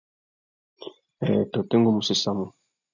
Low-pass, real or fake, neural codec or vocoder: 7.2 kHz; real; none